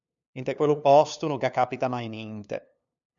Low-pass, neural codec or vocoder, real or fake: 7.2 kHz; codec, 16 kHz, 2 kbps, FunCodec, trained on LibriTTS, 25 frames a second; fake